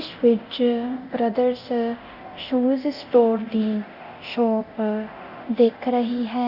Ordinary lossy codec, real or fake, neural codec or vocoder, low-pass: Opus, 64 kbps; fake; codec, 24 kHz, 0.9 kbps, DualCodec; 5.4 kHz